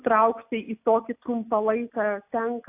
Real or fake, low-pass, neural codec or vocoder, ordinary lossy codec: real; 3.6 kHz; none; AAC, 32 kbps